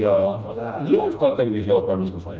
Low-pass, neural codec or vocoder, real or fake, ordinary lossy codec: none; codec, 16 kHz, 1 kbps, FreqCodec, smaller model; fake; none